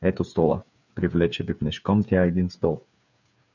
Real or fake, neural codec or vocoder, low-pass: fake; codec, 16 kHz, 8 kbps, FreqCodec, smaller model; 7.2 kHz